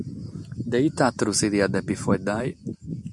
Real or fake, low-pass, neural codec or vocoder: real; 10.8 kHz; none